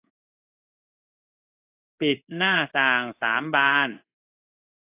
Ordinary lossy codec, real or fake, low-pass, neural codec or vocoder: AAC, 24 kbps; fake; 3.6 kHz; codec, 16 kHz in and 24 kHz out, 1 kbps, XY-Tokenizer